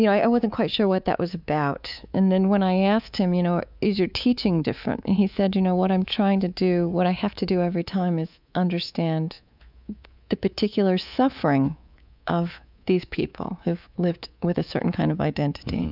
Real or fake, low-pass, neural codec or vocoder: fake; 5.4 kHz; codec, 24 kHz, 3.1 kbps, DualCodec